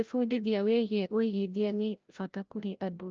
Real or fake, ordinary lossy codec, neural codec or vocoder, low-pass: fake; Opus, 24 kbps; codec, 16 kHz, 0.5 kbps, FreqCodec, larger model; 7.2 kHz